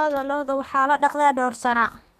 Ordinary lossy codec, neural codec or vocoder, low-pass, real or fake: none; codec, 32 kHz, 1.9 kbps, SNAC; 14.4 kHz; fake